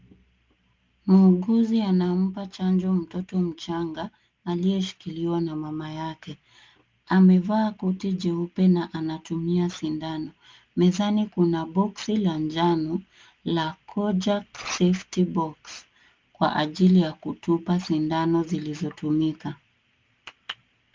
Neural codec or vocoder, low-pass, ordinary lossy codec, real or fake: none; 7.2 kHz; Opus, 24 kbps; real